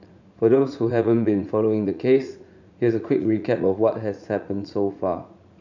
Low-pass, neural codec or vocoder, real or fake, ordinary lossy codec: 7.2 kHz; vocoder, 44.1 kHz, 80 mel bands, Vocos; fake; none